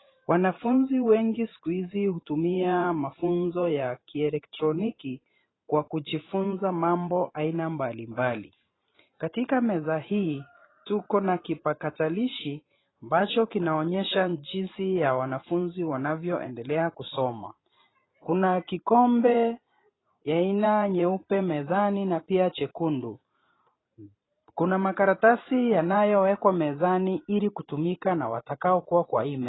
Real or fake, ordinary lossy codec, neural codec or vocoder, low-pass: fake; AAC, 16 kbps; vocoder, 44.1 kHz, 128 mel bands every 512 samples, BigVGAN v2; 7.2 kHz